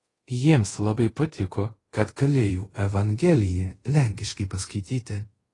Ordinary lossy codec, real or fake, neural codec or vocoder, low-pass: AAC, 32 kbps; fake; codec, 24 kHz, 0.5 kbps, DualCodec; 10.8 kHz